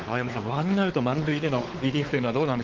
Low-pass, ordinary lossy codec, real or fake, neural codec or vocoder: 7.2 kHz; Opus, 16 kbps; fake; codec, 16 kHz, 4 kbps, X-Codec, WavLM features, trained on Multilingual LibriSpeech